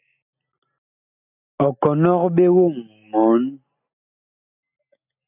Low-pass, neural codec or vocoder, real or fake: 3.6 kHz; none; real